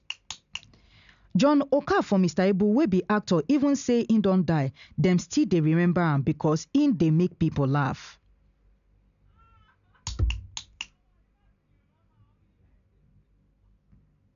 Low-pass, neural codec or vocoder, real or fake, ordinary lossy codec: 7.2 kHz; none; real; AAC, 96 kbps